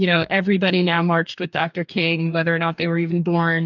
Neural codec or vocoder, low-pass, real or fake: codec, 44.1 kHz, 2.6 kbps, DAC; 7.2 kHz; fake